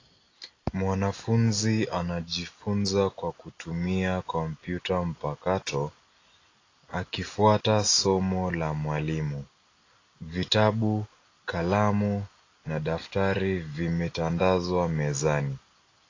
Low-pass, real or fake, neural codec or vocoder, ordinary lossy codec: 7.2 kHz; real; none; AAC, 32 kbps